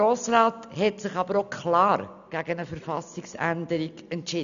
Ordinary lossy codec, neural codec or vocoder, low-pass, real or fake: none; none; 7.2 kHz; real